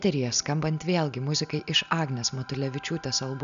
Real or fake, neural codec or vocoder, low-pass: real; none; 7.2 kHz